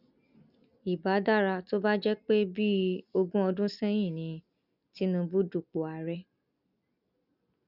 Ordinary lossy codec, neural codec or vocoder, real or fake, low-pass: none; none; real; 5.4 kHz